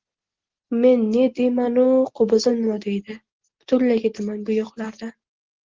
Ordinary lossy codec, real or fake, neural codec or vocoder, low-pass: Opus, 16 kbps; real; none; 7.2 kHz